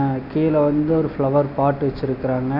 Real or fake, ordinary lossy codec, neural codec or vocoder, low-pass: real; none; none; 5.4 kHz